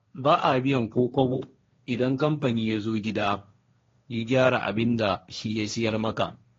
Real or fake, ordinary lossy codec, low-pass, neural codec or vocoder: fake; AAC, 32 kbps; 7.2 kHz; codec, 16 kHz, 1.1 kbps, Voila-Tokenizer